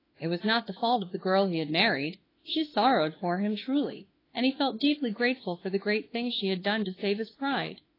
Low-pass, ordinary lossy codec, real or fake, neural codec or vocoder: 5.4 kHz; AAC, 24 kbps; fake; autoencoder, 48 kHz, 32 numbers a frame, DAC-VAE, trained on Japanese speech